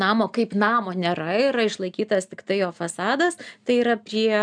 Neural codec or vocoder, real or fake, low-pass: none; real; 9.9 kHz